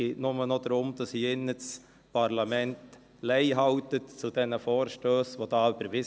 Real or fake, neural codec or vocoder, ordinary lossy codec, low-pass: real; none; none; none